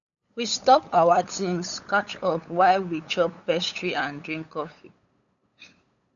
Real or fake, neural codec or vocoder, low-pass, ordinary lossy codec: fake; codec, 16 kHz, 8 kbps, FunCodec, trained on LibriTTS, 25 frames a second; 7.2 kHz; none